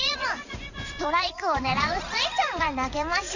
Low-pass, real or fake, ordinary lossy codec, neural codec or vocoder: 7.2 kHz; fake; none; vocoder, 44.1 kHz, 80 mel bands, Vocos